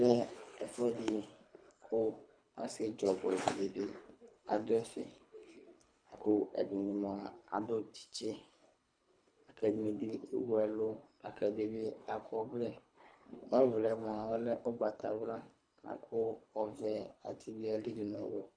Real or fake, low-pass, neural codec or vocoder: fake; 9.9 kHz; codec, 24 kHz, 3 kbps, HILCodec